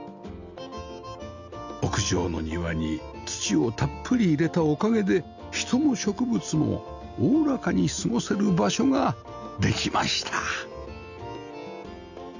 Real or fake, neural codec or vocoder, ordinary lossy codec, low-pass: real; none; none; 7.2 kHz